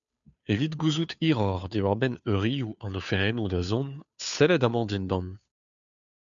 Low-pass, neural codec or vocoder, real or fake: 7.2 kHz; codec, 16 kHz, 2 kbps, FunCodec, trained on Chinese and English, 25 frames a second; fake